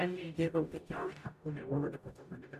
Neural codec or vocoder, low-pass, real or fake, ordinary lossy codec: codec, 44.1 kHz, 0.9 kbps, DAC; 14.4 kHz; fake; none